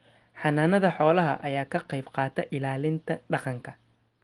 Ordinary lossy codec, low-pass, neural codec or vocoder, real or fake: Opus, 24 kbps; 10.8 kHz; none; real